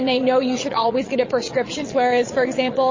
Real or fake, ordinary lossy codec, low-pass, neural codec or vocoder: fake; MP3, 32 kbps; 7.2 kHz; vocoder, 22.05 kHz, 80 mel bands, Vocos